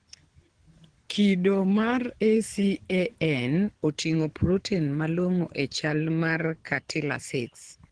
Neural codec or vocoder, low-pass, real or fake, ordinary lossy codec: codec, 24 kHz, 6 kbps, HILCodec; 9.9 kHz; fake; Opus, 16 kbps